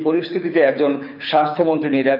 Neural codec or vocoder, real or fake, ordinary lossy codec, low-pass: codec, 24 kHz, 6 kbps, HILCodec; fake; none; 5.4 kHz